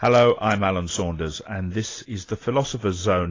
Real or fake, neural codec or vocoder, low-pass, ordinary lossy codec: real; none; 7.2 kHz; AAC, 32 kbps